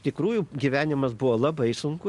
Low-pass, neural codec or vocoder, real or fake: 10.8 kHz; none; real